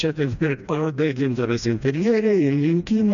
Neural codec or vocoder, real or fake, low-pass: codec, 16 kHz, 1 kbps, FreqCodec, smaller model; fake; 7.2 kHz